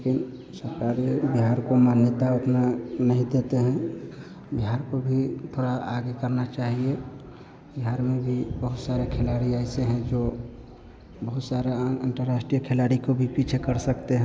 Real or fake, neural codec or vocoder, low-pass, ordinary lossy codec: real; none; none; none